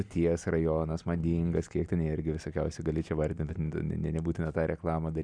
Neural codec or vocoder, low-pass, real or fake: none; 9.9 kHz; real